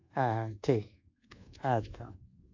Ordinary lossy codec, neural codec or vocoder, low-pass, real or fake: AAC, 48 kbps; codec, 24 kHz, 1.2 kbps, DualCodec; 7.2 kHz; fake